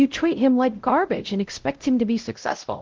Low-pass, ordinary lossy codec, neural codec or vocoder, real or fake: 7.2 kHz; Opus, 16 kbps; codec, 16 kHz, 0.5 kbps, X-Codec, WavLM features, trained on Multilingual LibriSpeech; fake